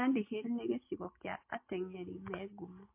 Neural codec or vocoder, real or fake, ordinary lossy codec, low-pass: none; real; MP3, 24 kbps; 3.6 kHz